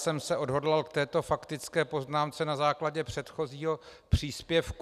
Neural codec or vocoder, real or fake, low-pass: none; real; 14.4 kHz